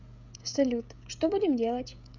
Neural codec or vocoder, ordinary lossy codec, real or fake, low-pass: codec, 16 kHz, 16 kbps, FreqCodec, smaller model; none; fake; 7.2 kHz